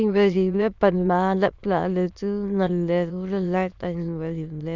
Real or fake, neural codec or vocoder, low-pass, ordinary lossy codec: fake; autoencoder, 22.05 kHz, a latent of 192 numbers a frame, VITS, trained on many speakers; 7.2 kHz; Opus, 64 kbps